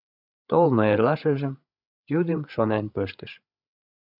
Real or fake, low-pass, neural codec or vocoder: fake; 5.4 kHz; codec, 16 kHz, 8 kbps, FreqCodec, larger model